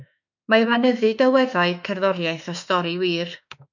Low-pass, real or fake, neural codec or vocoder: 7.2 kHz; fake; autoencoder, 48 kHz, 32 numbers a frame, DAC-VAE, trained on Japanese speech